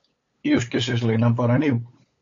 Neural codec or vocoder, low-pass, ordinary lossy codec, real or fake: codec, 16 kHz, 8 kbps, FunCodec, trained on LibriTTS, 25 frames a second; 7.2 kHz; AAC, 48 kbps; fake